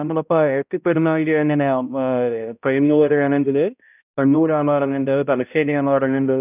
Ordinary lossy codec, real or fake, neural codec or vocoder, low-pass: none; fake; codec, 16 kHz, 0.5 kbps, X-Codec, HuBERT features, trained on balanced general audio; 3.6 kHz